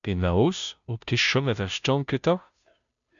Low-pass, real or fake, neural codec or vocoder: 7.2 kHz; fake; codec, 16 kHz, 0.5 kbps, FunCodec, trained on Chinese and English, 25 frames a second